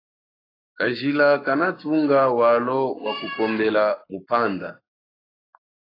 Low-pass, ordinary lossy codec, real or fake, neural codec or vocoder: 5.4 kHz; AAC, 24 kbps; fake; codec, 44.1 kHz, 7.8 kbps, Pupu-Codec